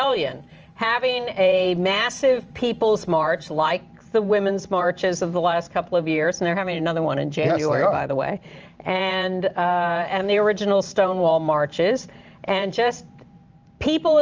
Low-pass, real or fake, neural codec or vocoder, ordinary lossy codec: 7.2 kHz; fake; vocoder, 44.1 kHz, 128 mel bands every 512 samples, BigVGAN v2; Opus, 24 kbps